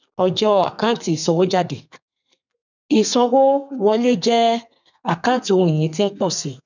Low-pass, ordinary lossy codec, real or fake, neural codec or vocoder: 7.2 kHz; none; fake; codec, 32 kHz, 1.9 kbps, SNAC